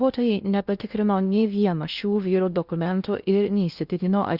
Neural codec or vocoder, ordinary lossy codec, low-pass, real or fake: codec, 16 kHz in and 24 kHz out, 0.6 kbps, FocalCodec, streaming, 2048 codes; Opus, 64 kbps; 5.4 kHz; fake